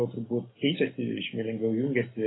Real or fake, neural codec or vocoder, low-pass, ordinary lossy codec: real; none; 7.2 kHz; AAC, 16 kbps